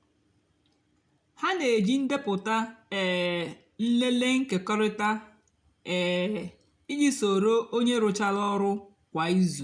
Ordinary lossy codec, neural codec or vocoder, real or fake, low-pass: none; none; real; 9.9 kHz